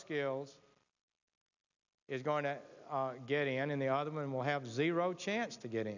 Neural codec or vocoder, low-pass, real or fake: none; 7.2 kHz; real